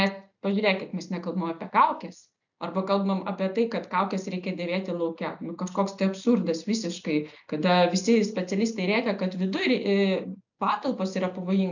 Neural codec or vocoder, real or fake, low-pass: none; real; 7.2 kHz